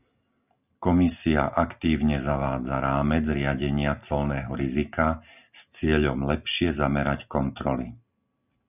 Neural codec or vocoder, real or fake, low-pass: none; real; 3.6 kHz